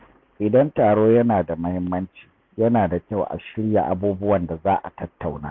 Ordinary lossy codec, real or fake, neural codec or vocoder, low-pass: MP3, 64 kbps; real; none; 7.2 kHz